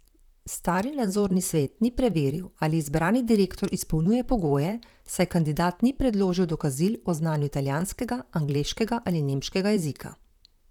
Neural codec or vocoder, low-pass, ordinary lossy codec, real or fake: vocoder, 44.1 kHz, 128 mel bands, Pupu-Vocoder; 19.8 kHz; none; fake